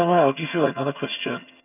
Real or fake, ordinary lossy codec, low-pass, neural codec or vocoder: fake; none; 3.6 kHz; vocoder, 22.05 kHz, 80 mel bands, HiFi-GAN